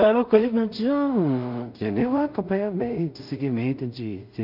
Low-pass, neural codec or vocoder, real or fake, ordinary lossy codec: 5.4 kHz; codec, 16 kHz in and 24 kHz out, 0.4 kbps, LongCat-Audio-Codec, two codebook decoder; fake; none